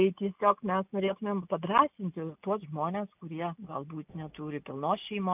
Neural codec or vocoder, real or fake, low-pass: none; real; 3.6 kHz